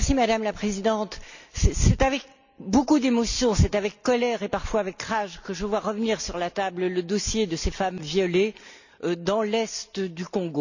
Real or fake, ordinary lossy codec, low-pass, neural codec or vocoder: real; none; 7.2 kHz; none